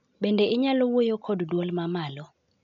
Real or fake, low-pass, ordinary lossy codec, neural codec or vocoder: real; 7.2 kHz; none; none